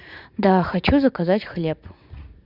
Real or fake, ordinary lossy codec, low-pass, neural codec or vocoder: fake; none; 5.4 kHz; vocoder, 44.1 kHz, 80 mel bands, Vocos